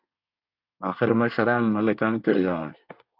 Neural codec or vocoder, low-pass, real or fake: codec, 24 kHz, 1 kbps, SNAC; 5.4 kHz; fake